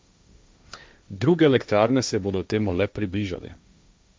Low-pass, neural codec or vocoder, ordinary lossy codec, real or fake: none; codec, 16 kHz, 1.1 kbps, Voila-Tokenizer; none; fake